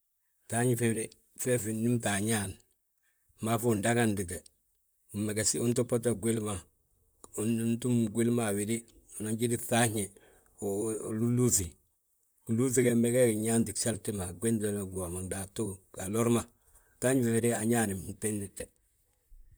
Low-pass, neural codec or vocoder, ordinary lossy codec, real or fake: none; vocoder, 44.1 kHz, 128 mel bands, Pupu-Vocoder; none; fake